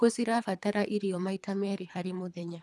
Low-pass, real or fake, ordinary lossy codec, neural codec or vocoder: none; fake; none; codec, 24 kHz, 3 kbps, HILCodec